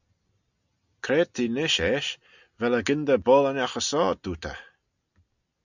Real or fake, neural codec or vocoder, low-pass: real; none; 7.2 kHz